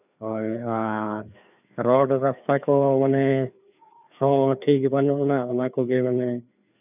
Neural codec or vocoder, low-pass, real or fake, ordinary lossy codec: codec, 16 kHz, 2 kbps, FreqCodec, larger model; 3.6 kHz; fake; none